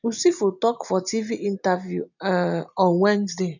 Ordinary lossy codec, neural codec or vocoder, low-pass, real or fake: none; none; 7.2 kHz; real